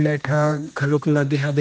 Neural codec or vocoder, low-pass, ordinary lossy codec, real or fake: codec, 16 kHz, 1 kbps, X-Codec, HuBERT features, trained on general audio; none; none; fake